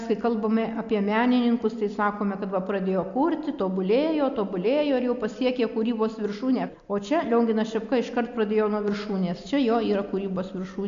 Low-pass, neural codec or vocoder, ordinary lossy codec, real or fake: 7.2 kHz; none; MP3, 64 kbps; real